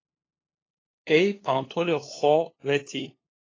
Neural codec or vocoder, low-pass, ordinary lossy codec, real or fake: codec, 16 kHz, 2 kbps, FunCodec, trained on LibriTTS, 25 frames a second; 7.2 kHz; AAC, 32 kbps; fake